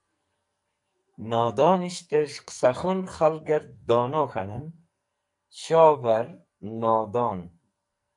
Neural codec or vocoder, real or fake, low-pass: codec, 44.1 kHz, 2.6 kbps, SNAC; fake; 10.8 kHz